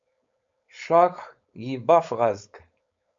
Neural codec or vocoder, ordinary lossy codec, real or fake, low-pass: codec, 16 kHz, 4.8 kbps, FACodec; MP3, 48 kbps; fake; 7.2 kHz